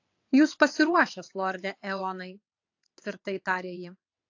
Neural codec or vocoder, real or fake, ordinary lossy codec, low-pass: vocoder, 22.05 kHz, 80 mel bands, WaveNeXt; fake; AAC, 48 kbps; 7.2 kHz